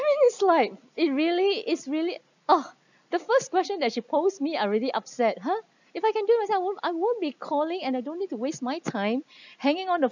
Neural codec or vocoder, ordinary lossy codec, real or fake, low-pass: none; none; real; 7.2 kHz